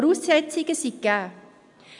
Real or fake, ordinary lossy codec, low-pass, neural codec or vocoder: real; none; 10.8 kHz; none